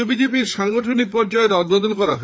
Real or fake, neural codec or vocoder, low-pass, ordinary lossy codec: fake; codec, 16 kHz, 4 kbps, FreqCodec, larger model; none; none